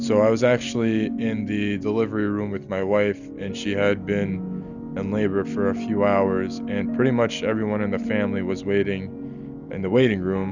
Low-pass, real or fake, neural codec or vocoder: 7.2 kHz; real; none